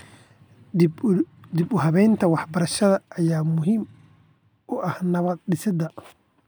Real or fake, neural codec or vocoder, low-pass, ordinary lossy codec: real; none; none; none